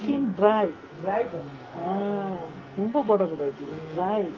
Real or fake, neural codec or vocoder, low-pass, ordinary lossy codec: fake; codec, 44.1 kHz, 2.6 kbps, SNAC; 7.2 kHz; Opus, 32 kbps